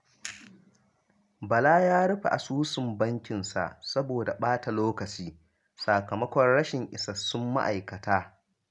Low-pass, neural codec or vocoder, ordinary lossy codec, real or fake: 10.8 kHz; none; MP3, 96 kbps; real